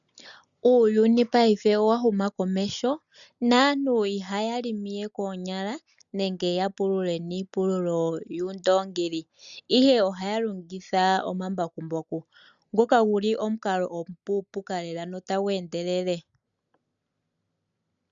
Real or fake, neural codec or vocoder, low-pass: real; none; 7.2 kHz